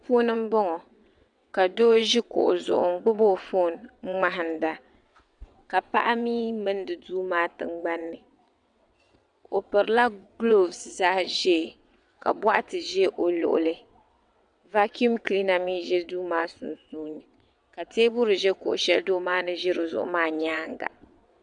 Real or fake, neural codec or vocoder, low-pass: fake; vocoder, 22.05 kHz, 80 mel bands, WaveNeXt; 9.9 kHz